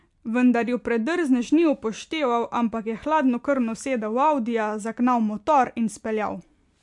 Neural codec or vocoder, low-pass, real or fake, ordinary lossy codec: none; 10.8 kHz; real; MP3, 64 kbps